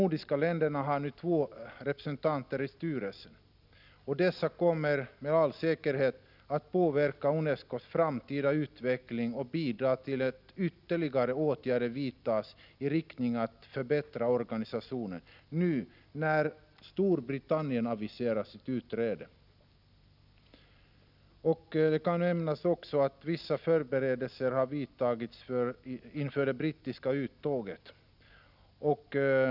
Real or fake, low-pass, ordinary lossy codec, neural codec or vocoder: real; 5.4 kHz; none; none